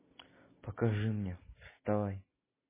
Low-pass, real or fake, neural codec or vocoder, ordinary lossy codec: 3.6 kHz; real; none; MP3, 16 kbps